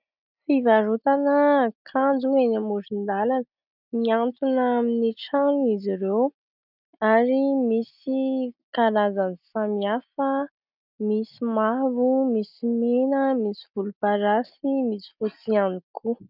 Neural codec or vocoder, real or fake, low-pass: none; real; 5.4 kHz